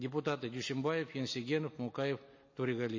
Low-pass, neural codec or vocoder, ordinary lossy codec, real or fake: 7.2 kHz; none; MP3, 32 kbps; real